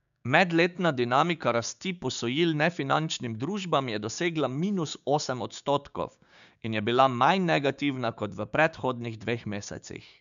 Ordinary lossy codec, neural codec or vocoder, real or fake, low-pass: none; codec, 16 kHz, 6 kbps, DAC; fake; 7.2 kHz